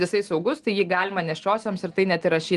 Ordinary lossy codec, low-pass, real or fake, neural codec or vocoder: Opus, 24 kbps; 10.8 kHz; real; none